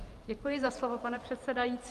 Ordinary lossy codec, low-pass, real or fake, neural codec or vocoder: Opus, 32 kbps; 14.4 kHz; fake; vocoder, 44.1 kHz, 128 mel bands every 512 samples, BigVGAN v2